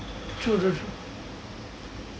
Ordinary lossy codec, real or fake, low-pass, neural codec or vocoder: none; real; none; none